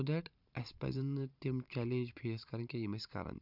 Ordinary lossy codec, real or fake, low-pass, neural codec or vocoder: none; fake; 5.4 kHz; vocoder, 44.1 kHz, 128 mel bands every 512 samples, BigVGAN v2